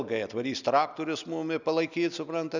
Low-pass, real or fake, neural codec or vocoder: 7.2 kHz; real; none